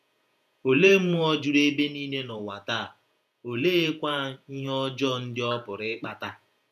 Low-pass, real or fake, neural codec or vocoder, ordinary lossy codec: 14.4 kHz; fake; vocoder, 48 kHz, 128 mel bands, Vocos; none